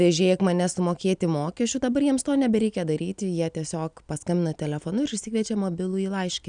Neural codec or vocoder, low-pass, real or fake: none; 9.9 kHz; real